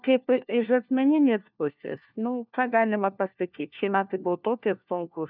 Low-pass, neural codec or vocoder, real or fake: 5.4 kHz; codec, 16 kHz, 1 kbps, FunCodec, trained on LibriTTS, 50 frames a second; fake